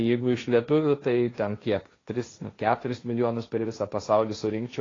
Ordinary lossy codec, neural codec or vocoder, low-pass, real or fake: AAC, 32 kbps; codec, 16 kHz, 1.1 kbps, Voila-Tokenizer; 7.2 kHz; fake